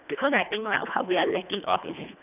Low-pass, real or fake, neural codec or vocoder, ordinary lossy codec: 3.6 kHz; fake; codec, 24 kHz, 1.5 kbps, HILCodec; none